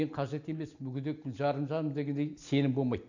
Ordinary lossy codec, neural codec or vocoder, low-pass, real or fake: none; none; 7.2 kHz; real